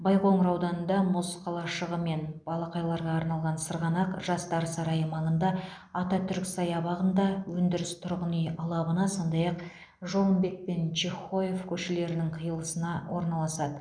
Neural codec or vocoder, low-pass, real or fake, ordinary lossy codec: none; 9.9 kHz; real; none